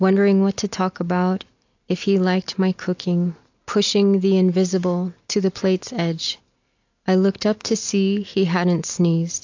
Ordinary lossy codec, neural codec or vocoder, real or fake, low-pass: AAC, 48 kbps; none; real; 7.2 kHz